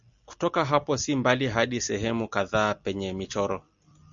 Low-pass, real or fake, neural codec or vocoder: 7.2 kHz; real; none